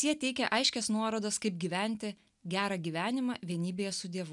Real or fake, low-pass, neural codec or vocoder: real; 10.8 kHz; none